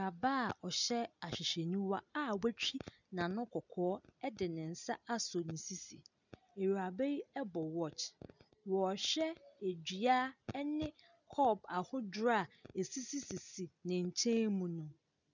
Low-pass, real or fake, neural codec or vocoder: 7.2 kHz; real; none